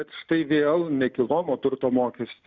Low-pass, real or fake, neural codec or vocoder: 7.2 kHz; real; none